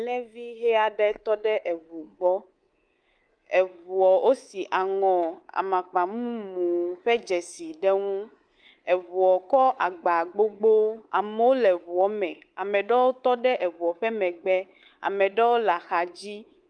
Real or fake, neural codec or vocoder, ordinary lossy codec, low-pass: fake; codec, 24 kHz, 3.1 kbps, DualCodec; Opus, 32 kbps; 9.9 kHz